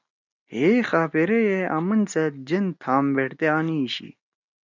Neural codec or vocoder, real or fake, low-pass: none; real; 7.2 kHz